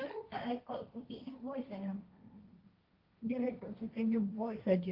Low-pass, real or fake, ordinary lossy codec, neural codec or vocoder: 5.4 kHz; fake; Opus, 24 kbps; codec, 16 kHz, 1.1 kbps, Voila-Tokenizer